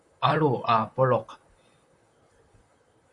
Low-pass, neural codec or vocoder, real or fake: 10.8 kHz; vocoder, 44.1 kHz, 128 mel bands, Pupu-Vocoder; fake